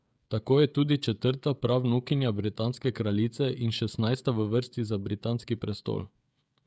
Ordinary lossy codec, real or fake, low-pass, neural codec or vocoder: none; fake; none; codec, 16 kHz, 16 kbps, FreqCodec, smaller model